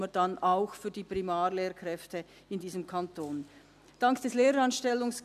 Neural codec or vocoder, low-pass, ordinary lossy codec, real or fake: none; 14.4 kHz; MP3, 96 kbps; real